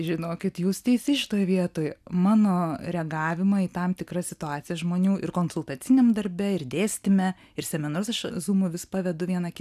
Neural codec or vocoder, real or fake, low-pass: none; real; 14.4 kHz